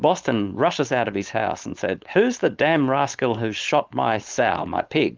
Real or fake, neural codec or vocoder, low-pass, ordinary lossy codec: fake; codec, 16 kHz, 4.8 kbps, FACodec; 7.2 kHz; Opus, 32 kbps